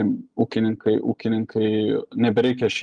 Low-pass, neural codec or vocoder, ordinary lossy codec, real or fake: 9.9 kHz; none; Opus, 32 kbps; real